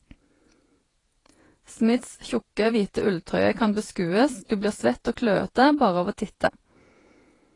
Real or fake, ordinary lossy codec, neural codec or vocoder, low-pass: fake; AAC, 32 kbps; vocoder, 48 kHz, 128 mel bands, Vocos; 10.8 kHz